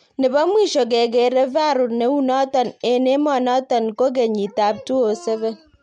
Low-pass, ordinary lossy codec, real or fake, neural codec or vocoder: 9.9 kHz; MP3, 64 kbps; real; none